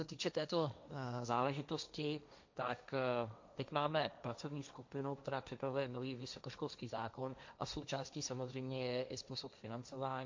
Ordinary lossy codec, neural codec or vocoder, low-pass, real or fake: MP3, 64 kbps; codec, 16 kHz, 1.1 kbps, Voila-Tokenizer; 7.2 kHz; fake